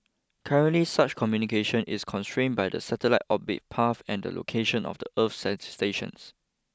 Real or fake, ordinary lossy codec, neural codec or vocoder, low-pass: real; none; none; none